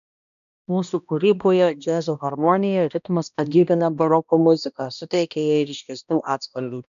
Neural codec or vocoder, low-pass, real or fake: codec, 16 kHz, 1 kbps, X-Codec, HuBERT features, trained on balanced general audio; 7.2 kHz; fake